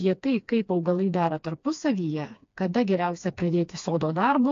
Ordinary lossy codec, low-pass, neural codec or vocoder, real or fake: AAC, 48 kbps; 7.2 kHz; codec, 16 kHz, 2 kbps, FreqCodec, smaller model; fake